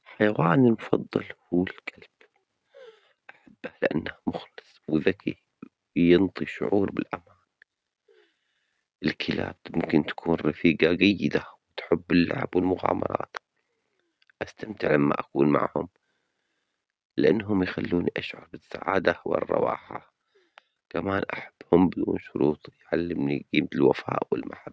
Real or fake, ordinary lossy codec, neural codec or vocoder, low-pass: real; none; none; none